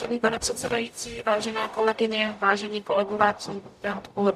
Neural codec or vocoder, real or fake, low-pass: codec, 44.1 kHz, 0.9 kbps, DAC; fake; 14.4 kHz